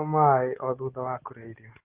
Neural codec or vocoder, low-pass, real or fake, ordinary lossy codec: none; 3.6 kHz; real; Opus, 16 kbps